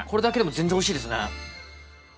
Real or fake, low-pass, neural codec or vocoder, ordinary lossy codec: real; none; none; none